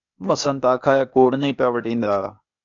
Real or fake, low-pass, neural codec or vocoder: fake; 7.2 kHz; codec, 16 kHz, 0.8 kbps, ZipCodec